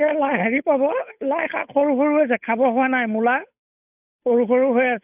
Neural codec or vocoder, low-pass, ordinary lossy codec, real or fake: codec, 16 kHz, 8 kbps, FunCodec, trained on Chinese and English, 25 frames a second; 3.6 kHz; none; fake